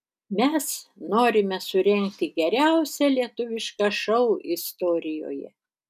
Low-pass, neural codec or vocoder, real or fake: 14.4 kHz; none; real